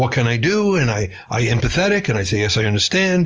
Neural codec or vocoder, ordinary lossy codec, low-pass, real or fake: none; Opus, 24 kbps; 7.2 kHz; real